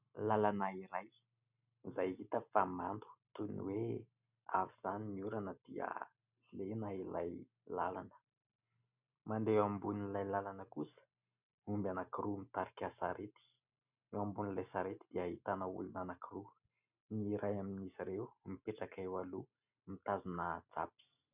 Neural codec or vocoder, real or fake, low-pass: none; real; 3.6 kHz